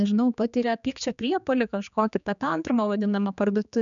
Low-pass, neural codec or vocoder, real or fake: 7.2 kHz; codec, 16 kHz, 2 kbps, X-Codec, HuBERT features, trained on general audio; fake